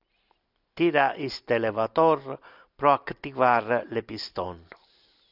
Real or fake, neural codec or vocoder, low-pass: real; none; 5.4 kHz